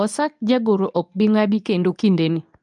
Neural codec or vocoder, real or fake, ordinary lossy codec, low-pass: codec, 24 kHz, 0.9 kbps, WavTokenizer, medium speech release version 2; fake; none; 10.8 kHz